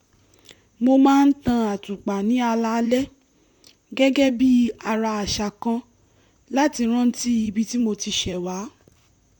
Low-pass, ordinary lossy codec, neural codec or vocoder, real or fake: 19.8 kHz; none; vocoder, 44.1 kHz, 128 mel bands, Pupu-Vocoder; fake